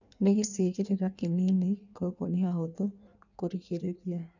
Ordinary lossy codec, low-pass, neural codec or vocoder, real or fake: none; 7.2 kHz; codec, 16 kHz in and 24 kHz out, 1.1 kbps, FireRedTTS-2 codec; fake